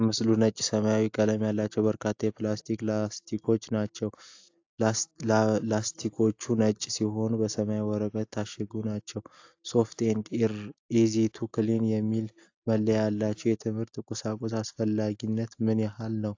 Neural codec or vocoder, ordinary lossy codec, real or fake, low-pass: none; AAC, 48 kbps; real; 7.2 kHz